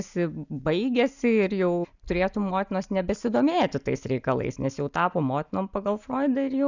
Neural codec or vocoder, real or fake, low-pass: none; real; 7.2 kHz